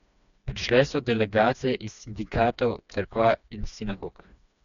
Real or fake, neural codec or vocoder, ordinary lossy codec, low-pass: fake; codec, 16 kHz, 2 kbps, FreqCodec, smaller model; none; 7.2 kHz